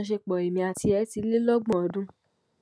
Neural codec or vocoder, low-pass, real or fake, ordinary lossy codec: none; none; real; none